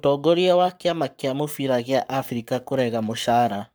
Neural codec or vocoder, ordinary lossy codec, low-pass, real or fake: codec, 44.1 kHz, 7.8 kbps, Pupu-Codec; none; none; fake